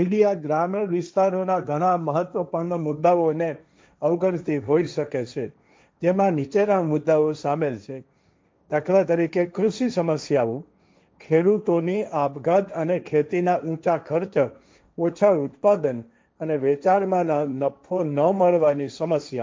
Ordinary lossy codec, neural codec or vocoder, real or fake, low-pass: none; codec, 16 kHz, 1.1 kbps, Voila-Tokenizer; fake; none